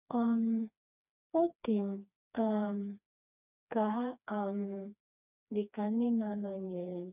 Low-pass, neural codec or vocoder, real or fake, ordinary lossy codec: 3.6 kHz; codec, 16 kHz, 2 kbps, FreqCodec, smaller model; fake; none